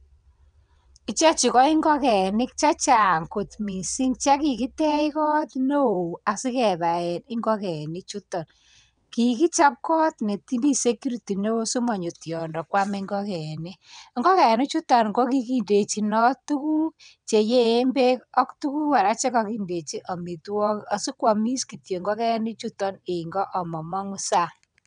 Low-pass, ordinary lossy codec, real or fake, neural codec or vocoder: 9.9 kHz; none; fake; vocoder, 22.05 kHz, 80 mel bands, WaveNeXt